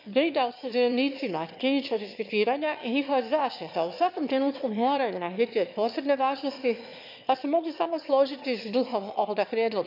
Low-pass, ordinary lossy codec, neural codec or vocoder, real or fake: 5.4 kHz; MP3, 48 kbps; autoencoder, 22.05 kHz, a latent of 192 numbers a frame, VITS, trained on one speaker; fake